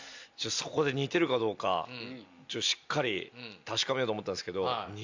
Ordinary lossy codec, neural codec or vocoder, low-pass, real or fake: MP3, 64 kbps; none; 7.2 kHz; real